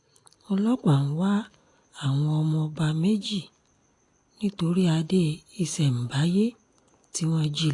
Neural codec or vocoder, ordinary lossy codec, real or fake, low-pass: none; AAC, 48 kbps; real; 10.8 kHz